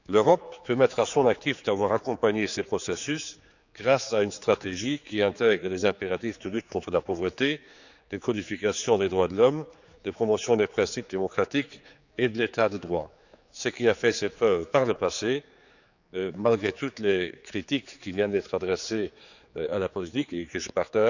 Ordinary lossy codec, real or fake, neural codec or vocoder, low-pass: none; fake; codec, 16 kHz, 4 kbps, X-Codec, HuBERT features, trained on general audio; 7.2 kHz